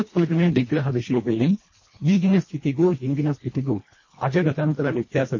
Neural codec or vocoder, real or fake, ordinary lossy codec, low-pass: codec, 24 kHz, 1.5 kbps, HILCodec; fake; MP3, 32 kbps; 7.2 kHz